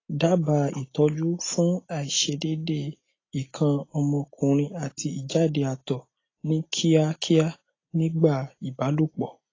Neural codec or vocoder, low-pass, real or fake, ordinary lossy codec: none; 7.2 kHz; real; AAC, 32 kbps